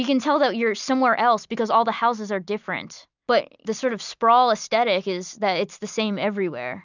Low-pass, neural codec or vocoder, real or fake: 7.2 kHz; none; real